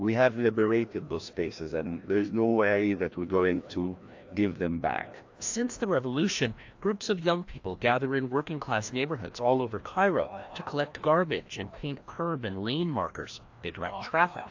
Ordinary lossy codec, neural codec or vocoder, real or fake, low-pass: AAC, 48 kbps; codec, 16 kHz, 1 kbps, FreqCodec, larger model; fake; 7.2 kHz